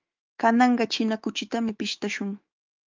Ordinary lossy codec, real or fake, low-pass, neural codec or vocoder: Opus, 24 kbps; fake; 7.2 kHz; autoencoder, 48 kHz, 128 numbers a frame, DAC-VAE, trained on Japanese speech